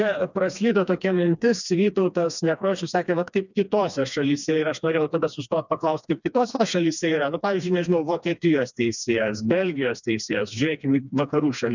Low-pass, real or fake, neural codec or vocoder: 7.2 kHz; fake; codec, 16 kHz, 2 kbps, FreqCodec, smaller model